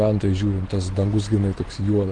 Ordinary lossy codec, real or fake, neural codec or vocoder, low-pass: Opus, 16 kbps; real; none; 9.9 kHz